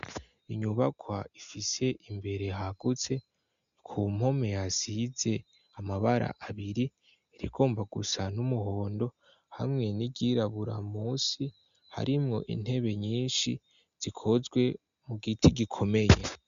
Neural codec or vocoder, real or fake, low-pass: none; real; 7.2 kHz